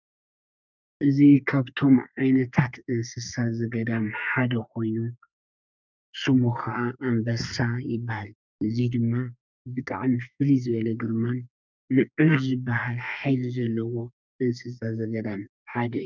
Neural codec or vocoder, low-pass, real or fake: codec, 32 kHz, 1.9 kbps, SNAC; 7.2 kHz; fake